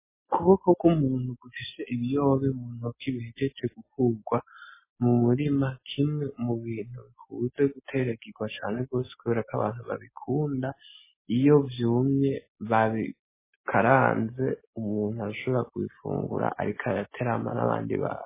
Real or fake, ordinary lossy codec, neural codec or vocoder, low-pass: real; MP3, 16 kbps; none; 3.6 kHz